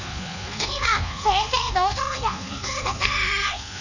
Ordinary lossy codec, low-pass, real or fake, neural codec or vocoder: none; 7.2 kHz; fake; codec, 24 kHz, 1.2 kbps, DualCodec